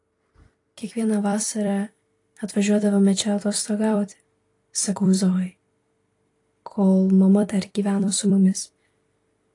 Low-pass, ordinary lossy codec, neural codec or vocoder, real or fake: 10.8 kHz; AAC, 48 kbps; vocoder, 44.1 kHz, 128 mel bands every 256 samples, BigVGAN v2; fake